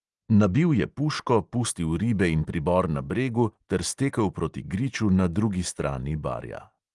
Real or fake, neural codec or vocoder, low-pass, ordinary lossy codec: real; none; 10.8 kHz; Opus, 24 kbps